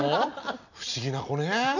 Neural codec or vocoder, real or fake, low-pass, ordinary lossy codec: none; real; 7.2 kHz; none